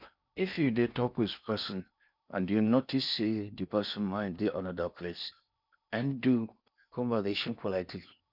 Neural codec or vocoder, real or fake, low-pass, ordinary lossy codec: codec, 16 kHz in and 24 kHz out, 0.8 kbps, FocalCodec, streaming, 65536 codes; fake; 5.4 kHz; none